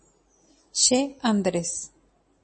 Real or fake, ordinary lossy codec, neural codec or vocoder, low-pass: real; MP3, 32 kbps; none; 10.8 kHz